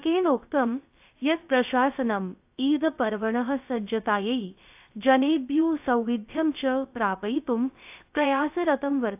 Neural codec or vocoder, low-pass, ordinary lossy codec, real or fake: codec, 16 kHz, 0.3 kbps, FocalCodec; 3.6 kHz; AAC, 32 kbps; fake